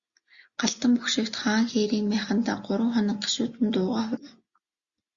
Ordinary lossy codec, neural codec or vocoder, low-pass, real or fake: Opus, 64 kbps; none; 7.2 kHz; real